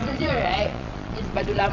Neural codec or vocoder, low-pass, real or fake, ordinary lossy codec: vocoder, 22.05 kHz, 80 mel bands, Vocos; 7.2 kHz; fake; Opus, 64 kbps